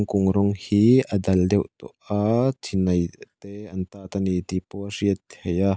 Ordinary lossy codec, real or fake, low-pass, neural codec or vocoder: none; real; none; none